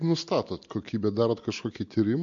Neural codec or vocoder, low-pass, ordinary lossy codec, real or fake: none; 7.2 kHz; MP3, 48 kbps; real